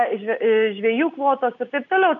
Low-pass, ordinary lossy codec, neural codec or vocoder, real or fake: 7.2 kHz; MP3, 96 kbps; none; real